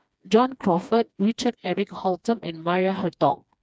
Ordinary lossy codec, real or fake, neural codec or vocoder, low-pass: none; fake; codec, 16 kHz, 2 kbps, FreqCodec, smaller model; none